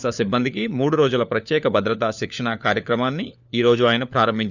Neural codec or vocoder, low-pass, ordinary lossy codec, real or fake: codec, 16 kHz, 4 kbps, FunCodec, trained on LibriTTS, 50 frames a second; 7.2 kHz; none; fake